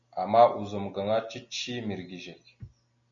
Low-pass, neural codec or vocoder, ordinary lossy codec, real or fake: 7.2 kHz; none; MP3, 64 kbps; real